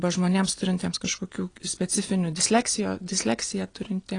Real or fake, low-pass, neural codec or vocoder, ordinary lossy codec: real; 9.9 kHz; none; AAC, 32 kbps